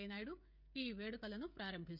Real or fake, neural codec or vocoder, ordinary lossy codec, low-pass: fake; codec, 16 kHz, 16 kbps, FreqCodec, smaller model; none; 5.4 kHz